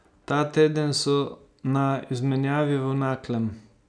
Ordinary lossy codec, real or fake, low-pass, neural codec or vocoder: none; real; 9.9 kHz; none